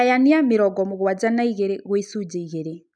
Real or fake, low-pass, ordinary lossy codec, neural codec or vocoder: real; 9.9 kHz; none; none